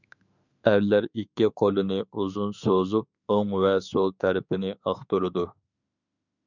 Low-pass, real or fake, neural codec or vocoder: 7.2 kHz; fake; autoencoder, 48 kHz, 32 numbers a frame, DAC-VAE, trained on Japanese speech